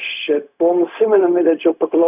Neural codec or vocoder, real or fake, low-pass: codec, 16 kHz, 0.4 kbps, LongCat-Audio-Codec; fake; 3.6 kHz